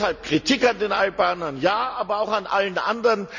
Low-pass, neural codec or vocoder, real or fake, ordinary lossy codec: 7.2 kHz; none; real; none